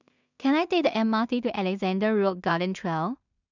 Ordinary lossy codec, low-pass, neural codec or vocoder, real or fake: none; 7.2 kHz; codec, 16 kHz in and 24 kHz out, 0.4 kbps, LongCat-Audio-Codec, two codebook decoder; fake